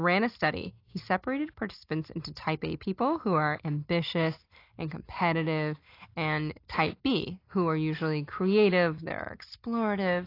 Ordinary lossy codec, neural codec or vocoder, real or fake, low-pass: AAC, 32 kbps; none; real; 5.4 kHz